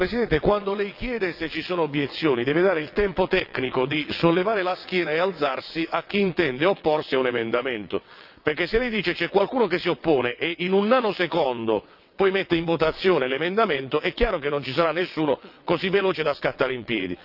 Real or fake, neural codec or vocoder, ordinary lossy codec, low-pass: fake; vocoder, 22.05 kHz, 80 mel bands, WaveNeXt; none; 5.4 kHz